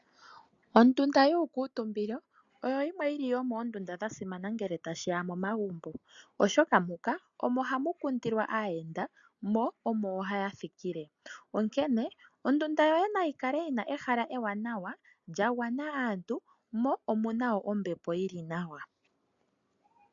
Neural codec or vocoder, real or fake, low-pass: none; real; 7.2 kHz